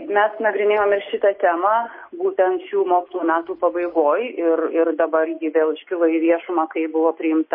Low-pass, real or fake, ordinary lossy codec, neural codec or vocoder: 5.4 kHz; real; MP3, 24 kbps; none